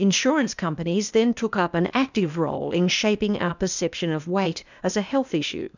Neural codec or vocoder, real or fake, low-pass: codec, 16 kHz, 0.8 kbps, ZipCodec; fake; 7.2 kHz